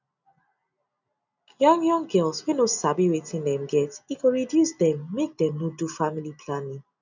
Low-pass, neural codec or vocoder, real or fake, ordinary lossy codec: 7.2 kHz; none; real; none